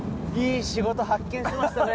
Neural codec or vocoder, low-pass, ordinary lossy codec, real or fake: none; none; none; real